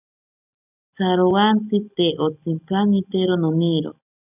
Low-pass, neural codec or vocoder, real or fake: 3.6 kHz; none; real